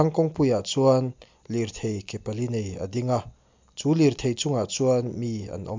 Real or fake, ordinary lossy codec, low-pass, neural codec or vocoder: real; MP3, 64 kbps; 7.2 kHz; none